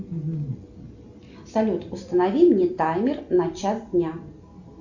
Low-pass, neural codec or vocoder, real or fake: 7.2 kHz; none; real